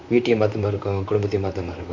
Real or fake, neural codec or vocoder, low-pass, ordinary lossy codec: fake; vocoder, 44.1 kHz, 128 mel bands, Pupu-Vocoder; 7.2 kHz; AAC, 48 kbps